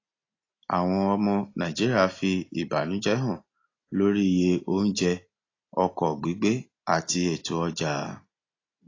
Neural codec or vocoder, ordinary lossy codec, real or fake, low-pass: none; AAC, 32 kbps; real; 7.2 kHz